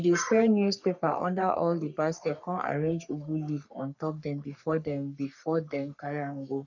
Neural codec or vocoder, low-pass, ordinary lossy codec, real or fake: codec, 44.1 kHz, 3.4 kbps, Pupu-Codec; 7.2 kHz; none; fake